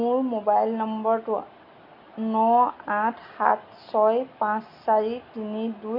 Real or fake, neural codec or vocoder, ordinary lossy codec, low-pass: real; none; none; 5.4 kHz